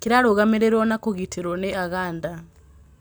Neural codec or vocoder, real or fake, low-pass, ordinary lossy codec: none; real; none; none